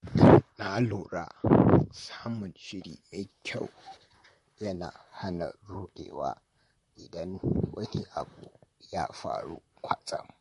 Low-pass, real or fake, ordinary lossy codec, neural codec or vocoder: 14.4 kHz; fake; MP3, 48 kbps; vocoder, 44.1 kHz, 128 mel bands, Pupu-Vocoder